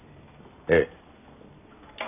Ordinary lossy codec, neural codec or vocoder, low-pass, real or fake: none; none; 3.6 kHz; real